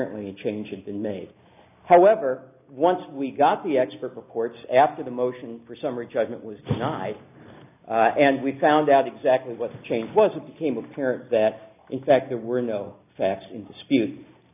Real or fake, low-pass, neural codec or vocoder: real; 3.6 kHz; none